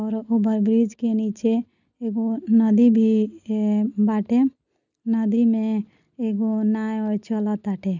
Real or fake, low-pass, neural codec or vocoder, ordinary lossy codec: real; 7.2 kHz; none; none